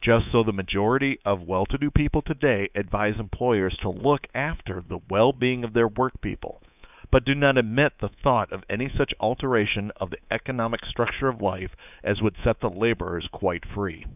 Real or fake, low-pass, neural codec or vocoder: fake; 3.6 kHz; codec, 24 kHz, 3.1 kbps, DualCodec